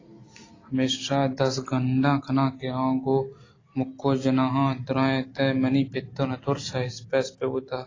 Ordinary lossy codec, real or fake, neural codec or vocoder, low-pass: AAC, 32 kbps; real; none; 7.2 kHz